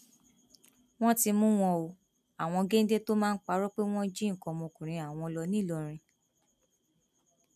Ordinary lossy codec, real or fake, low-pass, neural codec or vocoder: none; real; 14.4 kHz; none